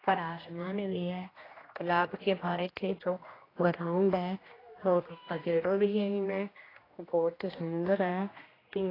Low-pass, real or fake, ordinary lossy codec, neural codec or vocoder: 5.4 kHz; fake; AAC, 24 kbps; codec, 16 kHz, 1 kbps, X-Codec, HuBERT features, trained on general audio